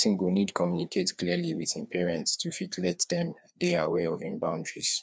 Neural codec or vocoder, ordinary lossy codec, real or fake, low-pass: codec, 16 kHz, 2 kbps, FreqCodec, larger model; none; fake; none